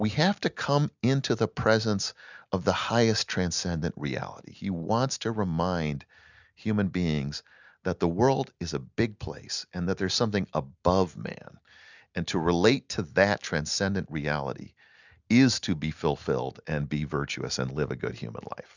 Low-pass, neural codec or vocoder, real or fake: 7.2 kHz; none; real